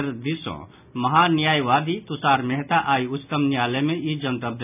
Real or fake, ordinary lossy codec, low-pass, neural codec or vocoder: real; none; 3.6 kHz; none